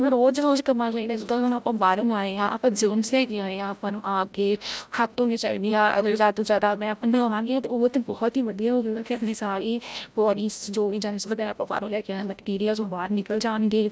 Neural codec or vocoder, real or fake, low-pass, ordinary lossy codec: codec, 16 kHz, 0.5 kbps, FreqCodec, larger model; fake; none; none